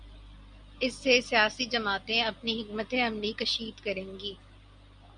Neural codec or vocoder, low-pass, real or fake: none; 9.9 kHz; real